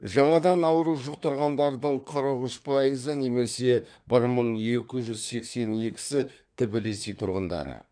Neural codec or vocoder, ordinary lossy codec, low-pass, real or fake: codec, 24 kHz, 1 kbps, SNAC; none; 9.9 kHz; fake